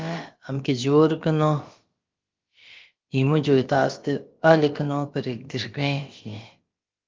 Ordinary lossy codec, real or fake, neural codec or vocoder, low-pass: Opus, 32 kbps; fake; codec, 16 kHz, about 1 kbps, DyCAST, with the encoder's durations; 7.2 kHz